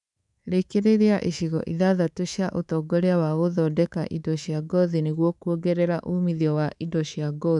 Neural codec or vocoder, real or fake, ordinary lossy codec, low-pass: codec, 24 kHz, 3.1 kbps, DualCodec; fake; none; 10.8 kHz